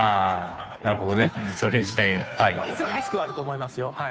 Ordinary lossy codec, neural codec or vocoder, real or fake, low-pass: none; codec, 16 kHz, 2 kbps, FunCodec, trained on Chinese and English, 25 frames a second; fake; none